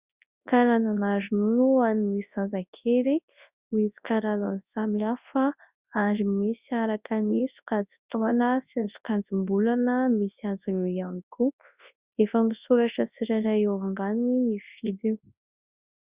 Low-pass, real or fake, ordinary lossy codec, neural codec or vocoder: 3.6 kHz; fake; Opus, 64 kbps; codec, 24 kHz, 0.9 kbps, WavTokenizer, large speech release